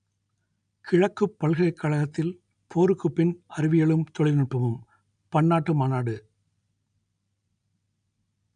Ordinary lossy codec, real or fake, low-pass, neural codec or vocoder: none; real; 9.9 kHz; none